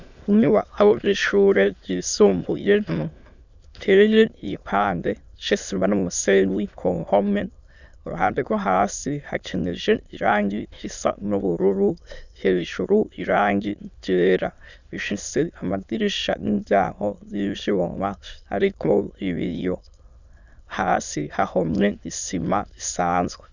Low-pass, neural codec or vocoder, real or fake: 7.2 kHz; autoencoder, 22.05 kHz, a latent of 192 numbers a frame, VITS, trained on many speakers; fake